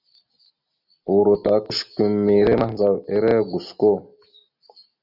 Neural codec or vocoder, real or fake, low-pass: none; real; 5.4 kHz